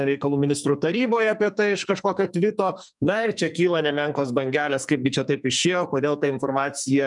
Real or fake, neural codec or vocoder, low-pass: fake; codec, 44.1 kHz, 2.6 kbps, SNAC; 10.8 kHz